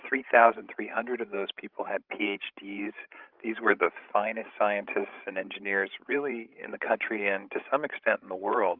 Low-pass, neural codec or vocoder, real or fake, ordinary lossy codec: 5.4 kHz; codec, 16 kHz, 16 kbps, FreqCodec, larger model; fake; Opus, 24 kbps